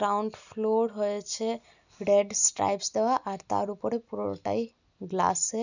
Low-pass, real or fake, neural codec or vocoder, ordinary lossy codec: 7.2 kHz; real; none; none